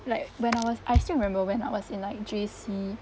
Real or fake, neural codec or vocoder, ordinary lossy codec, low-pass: real; none; none; none